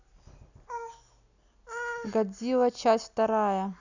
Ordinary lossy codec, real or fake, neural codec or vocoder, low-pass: none; real; none; 7.2 kHz